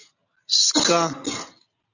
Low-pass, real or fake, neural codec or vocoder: 7.2 kHz; real; none